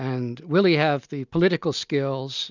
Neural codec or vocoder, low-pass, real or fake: none; 7.2 kHz; real